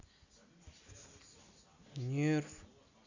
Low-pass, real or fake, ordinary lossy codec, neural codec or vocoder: 7.2 kHz; real; Opus, 64 kbps; none